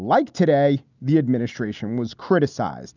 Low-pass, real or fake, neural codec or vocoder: 7.2 kHz; real; none